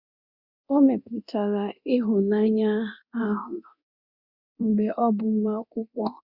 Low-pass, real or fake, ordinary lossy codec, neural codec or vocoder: 5.4 kHz; fake; Opus, 64 kbps; codec, 24 kHz, 0.9 kbps, DualCodec